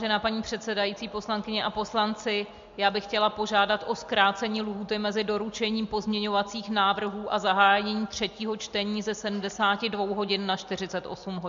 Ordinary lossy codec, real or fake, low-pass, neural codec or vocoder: MP3, 48 kbps; real; 7.2 kHz; none